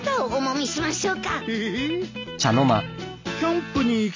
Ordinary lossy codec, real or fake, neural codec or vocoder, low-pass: MP3, 48 kbps; real; none; 7.2 kHz